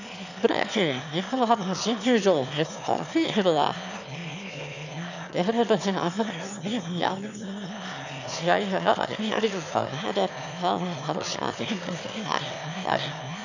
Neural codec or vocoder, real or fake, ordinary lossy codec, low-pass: autoencoder, 22.05 kHz, a latent of 192 numbers a frame, VITS, trained on one speaker; fake; none; 7.2 kHz